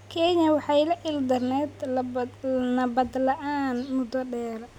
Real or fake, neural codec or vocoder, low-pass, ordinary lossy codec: real; none; 19.8 kHz; none